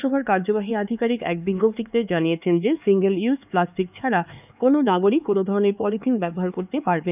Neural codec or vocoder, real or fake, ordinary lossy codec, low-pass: codec, 16 kHz, 4 kbps, X-Codec, HuBERT features, trained on LibriSpeech; fake; none; 3.6 kHz